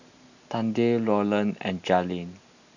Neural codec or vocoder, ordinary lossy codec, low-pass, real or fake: none; none; 7.2 kHz; real